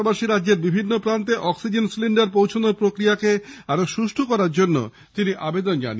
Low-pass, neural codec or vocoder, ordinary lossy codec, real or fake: none; none; none; real